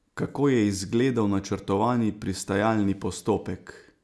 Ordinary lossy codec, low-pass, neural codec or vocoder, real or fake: none; none; none; real